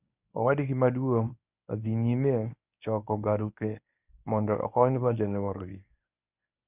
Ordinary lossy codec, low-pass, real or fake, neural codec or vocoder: none; 3.6 kHz; fake; codec, 24 kHz, 0.9 kbps, WavTokenizer, medium speech release version 1